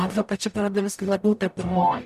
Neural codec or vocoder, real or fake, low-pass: codec, 44.1 kHz, 0.9 kbps, DAC; fake; 14.4 kHz